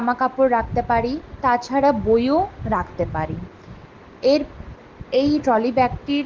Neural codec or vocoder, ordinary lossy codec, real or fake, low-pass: none; Opus, 16 kbps; real; 7.2 kHz